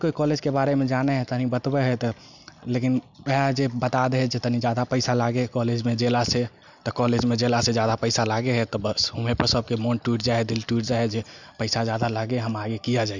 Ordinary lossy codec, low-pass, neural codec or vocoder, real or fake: none; 7.2 kHz; none; real